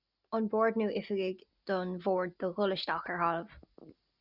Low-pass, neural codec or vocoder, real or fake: 5.4 kHz; none; real